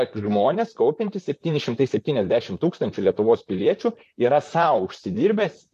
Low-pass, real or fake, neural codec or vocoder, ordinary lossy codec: 14.4 kHz; fake; vocoder, 44.1 kHz, 128 mel bands, Pupu-Vocoder; MP3, 64 kbps